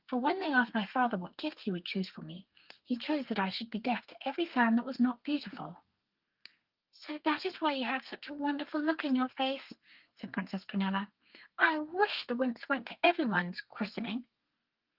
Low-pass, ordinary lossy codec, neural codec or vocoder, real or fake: 5.4 kHz; Opus, 32 kbps; codec, 32 kHz, 1.9 kbps, SNAC; fake